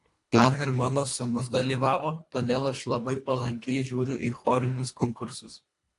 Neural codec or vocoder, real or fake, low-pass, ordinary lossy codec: codec, 24 kHz, 1.5 kbps, HILCodec; fake; 10.8 kHz; AAC, 48 kbps